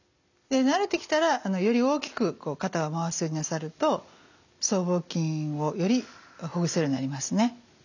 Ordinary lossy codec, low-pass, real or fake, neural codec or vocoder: none; 7.2 kHz; real; none